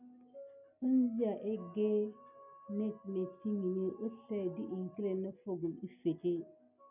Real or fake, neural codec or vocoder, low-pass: real; none; 3.6 kHz